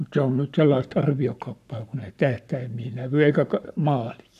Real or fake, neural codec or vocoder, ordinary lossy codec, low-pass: fake; codec, 44.1 kHz, 7.8 kbps, Pupu-Codec; none; 14.4 kHz